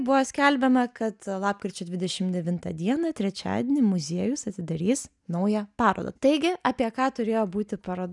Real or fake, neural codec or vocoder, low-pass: real; none; 10.8 kHz